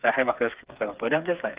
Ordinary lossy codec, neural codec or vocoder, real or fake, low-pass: Opus, 32 kbps; codec, 16 kHz, 4 kbps, FreqCodec, smaller model; fake; 3.6 kHz